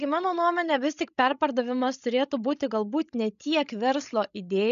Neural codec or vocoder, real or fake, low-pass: codec, 16 kHz, 16 kbps, FreqCodec, larger model; fake; 7.2 kHz